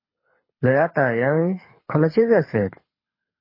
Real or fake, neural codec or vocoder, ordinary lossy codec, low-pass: fake; codec, 24 kHz, 6 kbps, HILCodec; MP3, 24 kbps; 5.4 kHz